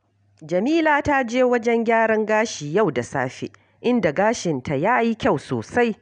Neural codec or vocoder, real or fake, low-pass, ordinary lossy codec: none; real; 9.9 kHz; none